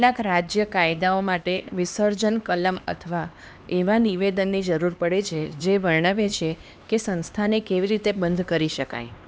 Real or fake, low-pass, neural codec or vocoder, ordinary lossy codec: fake; none; codec, 16 kHz, 2 kbps, X-Codec, HuBERT features, trained on LibriSpeech; none